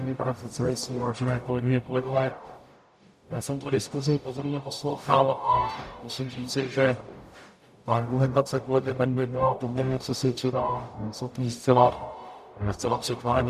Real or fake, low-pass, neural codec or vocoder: fake; 14.4 kHz; codec, 44.1 kHz, 0.9 kbps, DAC